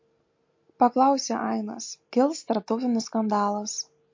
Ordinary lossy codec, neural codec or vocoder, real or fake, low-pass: MP3, 48 kbps; none; real; 7.2 kHz